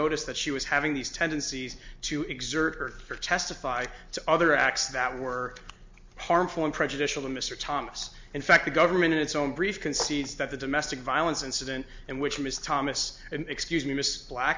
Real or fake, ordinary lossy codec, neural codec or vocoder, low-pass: real; MP3, 64 kbps; none; 7.2 kHz